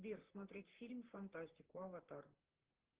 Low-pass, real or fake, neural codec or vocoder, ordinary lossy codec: 3.6 kHz; fake; vocoder, 44.1 kHz, 128 mel bands, Pupu-Vocoder; Opus, 16 kbps